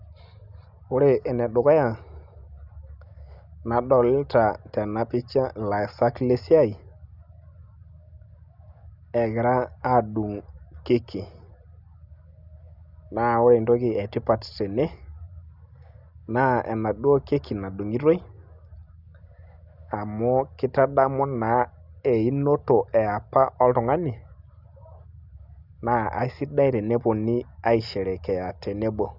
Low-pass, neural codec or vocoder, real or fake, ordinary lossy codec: 5.4 kHz; none; real; Opus, 64 kbps